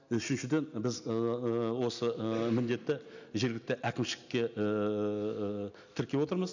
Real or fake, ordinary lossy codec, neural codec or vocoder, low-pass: real; none; none; 7.2 kHz